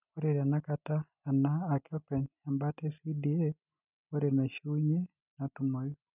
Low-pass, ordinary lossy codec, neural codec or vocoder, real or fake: 3.6 kHz; none; none; real